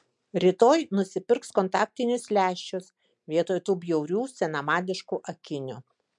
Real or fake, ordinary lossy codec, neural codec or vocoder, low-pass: real; MP3, 64 kbps; none; 10.8 kHz